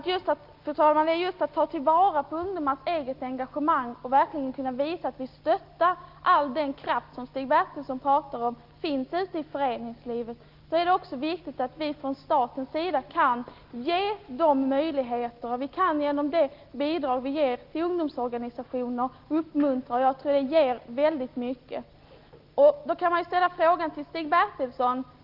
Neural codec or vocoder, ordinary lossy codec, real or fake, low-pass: none; Opus, 32 kbps; real; 5.4 kHz